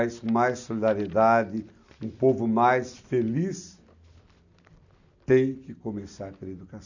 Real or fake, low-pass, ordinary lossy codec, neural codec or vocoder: real; 7.2 kHz; none; none